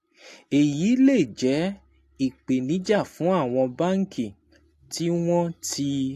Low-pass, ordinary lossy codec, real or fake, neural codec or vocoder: 14.4 kHz; AAC, 64 kbps; real; none